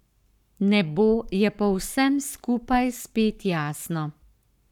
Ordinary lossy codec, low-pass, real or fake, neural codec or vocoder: none; 19.8 kHz; fake; codec, 44.1 kHz, 7.8 kbps, Pupu-Codec